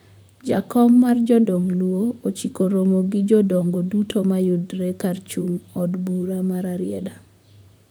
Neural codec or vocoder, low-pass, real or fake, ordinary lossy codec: vocoder, 44.1 kHz, 128 mel bands, Pupu-Vocoder; none; fake; none